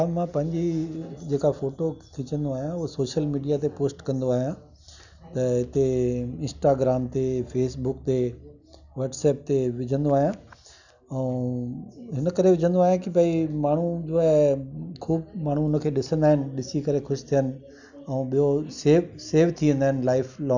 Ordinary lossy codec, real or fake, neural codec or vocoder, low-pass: none; real; none; 7.2 kHz